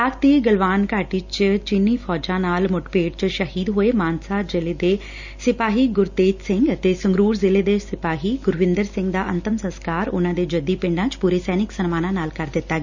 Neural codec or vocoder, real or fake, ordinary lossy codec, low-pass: none; real; Opus, 64 kbps; 7.2 kHz